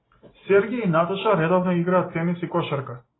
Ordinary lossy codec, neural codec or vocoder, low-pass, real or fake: AAC, 16 kbps; none; 7.2 kHz; real